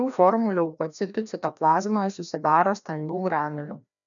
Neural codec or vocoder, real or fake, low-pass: codec, 16 kHz, 1 kbps, FreqCodec, larger model; fake; 7.2 kHz